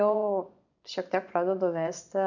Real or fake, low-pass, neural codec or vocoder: fake; 7.2 kHz; vocoder, 24 kHz, 100 mel bands, Vocos